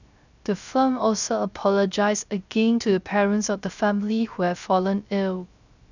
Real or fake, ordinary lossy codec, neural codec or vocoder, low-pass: fake; none; codec, 16 kHz, 0.3 kbps, FocalCodec; 7.2 kHz